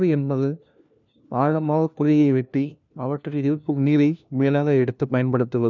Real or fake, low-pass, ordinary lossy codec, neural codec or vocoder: fake; 7.2 kHz; none; codec, 16 kHz, 1 kbps, FunCodec, trained on LibriTTS, 50 frames a second